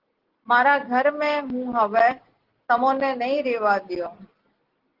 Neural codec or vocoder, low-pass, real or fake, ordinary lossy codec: none; 5.4 kHz; real; Opus, 16 kbps